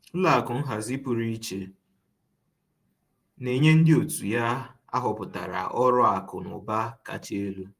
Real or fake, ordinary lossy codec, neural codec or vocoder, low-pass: fake; Opus, 32 kbps; vocoder, 48 kHz, 128 mel bands, Vocos; 14.4 kHz